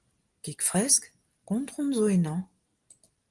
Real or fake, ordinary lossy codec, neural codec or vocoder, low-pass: real; Opus, 24 kbps; none; 10.8 kHz